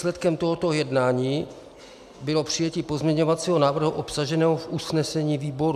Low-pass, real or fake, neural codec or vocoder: 14.4 kHz; real; none